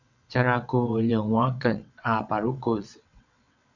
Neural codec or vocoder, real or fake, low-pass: vocoder, 22.05 kHz, 80 mel bands, WaveNeXt; fake; 7.2 kHz